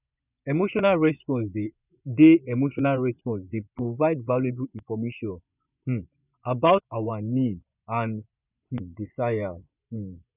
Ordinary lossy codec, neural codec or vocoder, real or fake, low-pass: none; vocoder, 24 kHz, 100 mel bands, Vocos; fake; 3.6 kHz